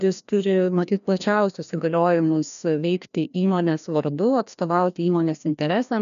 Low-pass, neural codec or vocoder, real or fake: 7.2 kHz; codec, 16 kHz, 1 kbps, FreqCodec, larger model; fake